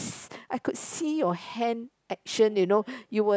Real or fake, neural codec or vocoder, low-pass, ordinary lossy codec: real; none; none; none